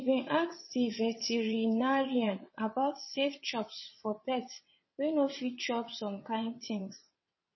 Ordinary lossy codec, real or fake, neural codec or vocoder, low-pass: MP3, 24 kbps; fake; vocoder, 22.05 kHz, 80 mel bands, WaveNeXt; 7.2 kHz